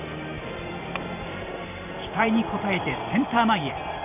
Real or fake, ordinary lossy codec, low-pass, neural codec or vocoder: fake; none; 3.6 kHz; vocoder, 44.1 kHz, 128 mel bands every 512 samples, BigVGAN v2